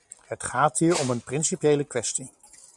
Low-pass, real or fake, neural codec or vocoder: 10.8 kHz; real; none